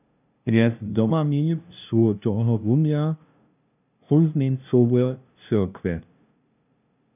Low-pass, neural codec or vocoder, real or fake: 3.6 kHz; codec, 16 kHz, 0.5 kbps, FunCodec, trained on LibriTTS, 25 frames a second; fake